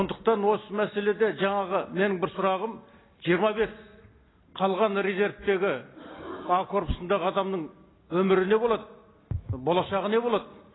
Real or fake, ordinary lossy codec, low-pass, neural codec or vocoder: real; AAC, 16 kbps; 7.2 kHz; none